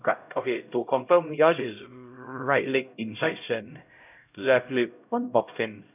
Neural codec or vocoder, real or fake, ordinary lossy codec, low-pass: codec, 16 kHz, 0.5 kbps, X-Codec, HuBERT features, trained on LibriSpeech; fake; none; 3.6 kHz